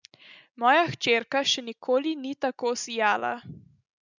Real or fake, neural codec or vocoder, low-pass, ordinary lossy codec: real; none; 7.2 kHz; none